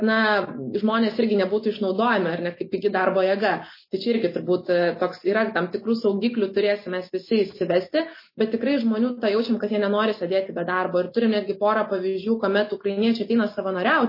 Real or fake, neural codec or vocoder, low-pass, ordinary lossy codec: real; none; 5.4 kHz; MP3, 24 kbps